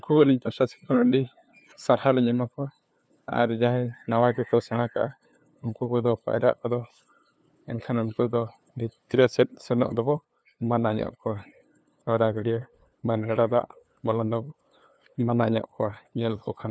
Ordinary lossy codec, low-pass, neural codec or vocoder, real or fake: none; none; codec, 16 kHz, 2 kbps, FunCodec, trained on LibriTTS, 25 frames a second; fake